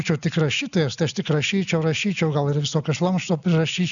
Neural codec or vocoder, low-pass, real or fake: none; 7.2 kHz; real